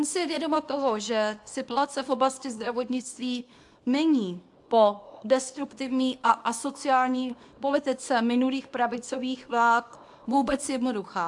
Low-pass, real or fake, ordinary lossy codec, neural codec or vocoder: 10.8 kHz; fake; AAC, 64 kbps; codec, 24 kHz, 0.9 kbps, WavTokenizer, small release